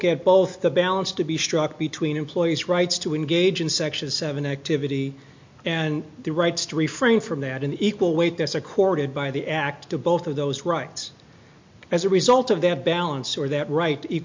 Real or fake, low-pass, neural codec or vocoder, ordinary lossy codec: real; 7.2 kHz; none; MP3, 48 kbps